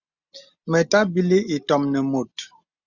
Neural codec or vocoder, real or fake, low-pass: none; real; 7.2 kHz